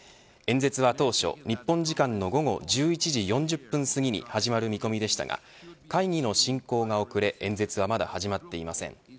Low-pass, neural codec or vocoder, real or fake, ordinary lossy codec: none; none; real; none